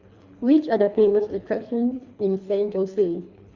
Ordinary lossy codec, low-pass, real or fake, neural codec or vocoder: Opus, 64 kbps; 7.2 kHz; fake; codec, 24 kHz, 3 kbps, HILCodec